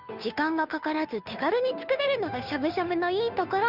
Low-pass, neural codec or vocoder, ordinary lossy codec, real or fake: 5.4 kHz; codec, 16 kHz, 2 kbps, FunCodec, trained on Chinese and English, 25 frames a second; none; fake